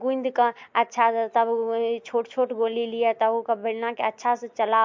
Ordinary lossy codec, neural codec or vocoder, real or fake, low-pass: MP3, 48 kbps; none; real; 7.2 kHz